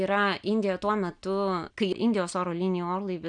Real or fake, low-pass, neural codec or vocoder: real; 9.9 kHz; none